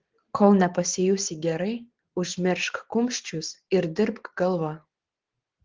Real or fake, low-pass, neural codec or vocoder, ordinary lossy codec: real; 7.2 kHz; none; Opus, 16 kbps